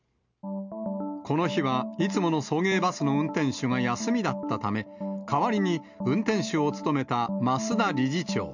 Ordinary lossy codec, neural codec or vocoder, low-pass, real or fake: none; none; 7.2 kHz; real